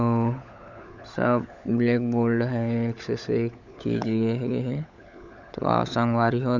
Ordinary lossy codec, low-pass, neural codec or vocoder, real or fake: none; 7.2 kHz; codec, 16 kHz, 16 kbps, FunCodec, trained on LibriTTS, 50 frames a second; fake